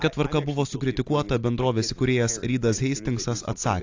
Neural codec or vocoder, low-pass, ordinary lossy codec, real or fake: none; 7.2 kHz; AAC, 48 kbps; real